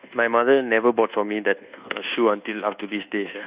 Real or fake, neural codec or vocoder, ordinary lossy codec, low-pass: fake; codec, 24 kHz, 1.2 kbps, DualCodec; Opus, 64 kbps; 3.6 kHz